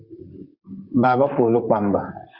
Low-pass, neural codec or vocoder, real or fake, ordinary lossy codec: 5.4 kHz; vocoder, 44.1 kHz, 128 mel bands, Pupu-Vocoder; fake; Opus, 64 kbps